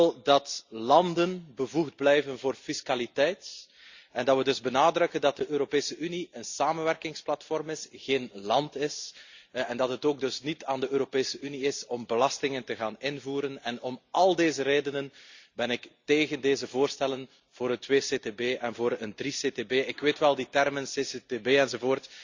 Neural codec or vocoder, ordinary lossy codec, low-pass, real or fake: none; Opus, 64 kbps; 7.2 kHz; real